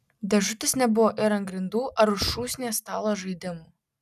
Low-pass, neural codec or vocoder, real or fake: 14.4 kHz; none; real